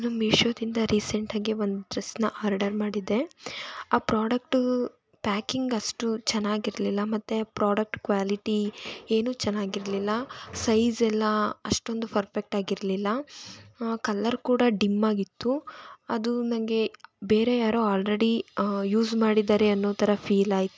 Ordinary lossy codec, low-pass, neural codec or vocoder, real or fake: none; none; none; real